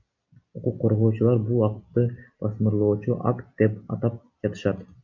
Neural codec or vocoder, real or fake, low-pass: none; real; 7.2 kHz